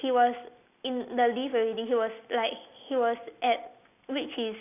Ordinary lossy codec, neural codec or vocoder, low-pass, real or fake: none; none; 3.6 kHz; real